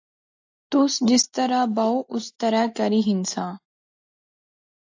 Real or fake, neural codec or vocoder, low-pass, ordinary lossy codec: real; none; 7.2 kHz; AAC, 48 kbps